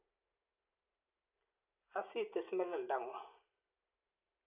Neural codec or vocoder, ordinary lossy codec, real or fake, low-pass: none; AAC, 24 kbps; real; 3.6 kHz